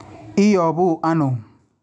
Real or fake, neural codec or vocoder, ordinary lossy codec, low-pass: real; none; none; 10.8 kHz